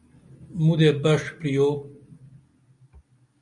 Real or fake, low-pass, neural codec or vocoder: real; 10.8 kHz; none